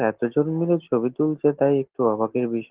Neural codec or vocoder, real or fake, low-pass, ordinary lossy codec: none; real; 3.6 kHz; Opus, 16 kbps